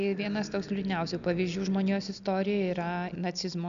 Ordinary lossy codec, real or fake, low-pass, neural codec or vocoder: MP3, 96 kbps; real; 7.2 kHz; none